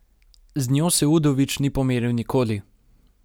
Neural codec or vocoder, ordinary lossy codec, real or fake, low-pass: none; none; real; none